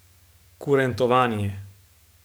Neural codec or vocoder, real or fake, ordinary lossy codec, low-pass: codec, 44.1 kHz, 7.8 kbps, DAC; fake; none; none